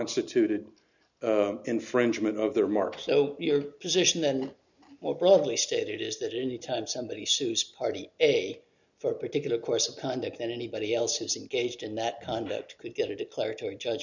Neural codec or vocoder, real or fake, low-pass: none; real; 7.2 kHz